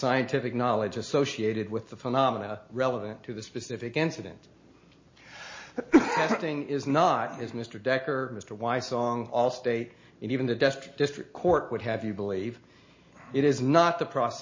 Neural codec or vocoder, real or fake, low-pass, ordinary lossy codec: none; real; 7.2 kHz; MP3, 32 kbps